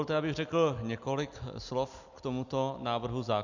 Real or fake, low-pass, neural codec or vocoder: real; 7.2 kHz; none